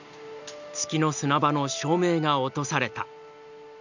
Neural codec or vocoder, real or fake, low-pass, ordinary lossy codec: none; real; 7.2 kHz; none